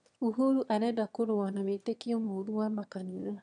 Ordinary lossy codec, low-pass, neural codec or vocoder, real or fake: none; 9.9 kHz; autoencoder, 22.05 kHz, a latent of 192 numbers a frame, VITS, trained on one speaker; fake